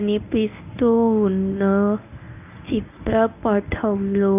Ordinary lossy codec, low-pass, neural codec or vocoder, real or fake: none; 3.6 kHz; codec, 24 kHz, 0.9 kbps, WavTokenizer, medium speech release version 1; fake